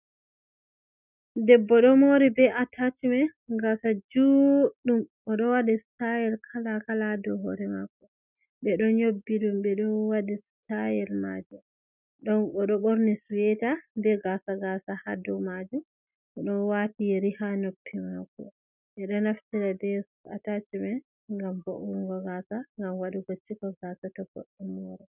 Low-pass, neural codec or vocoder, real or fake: 3.6 kHz; none; real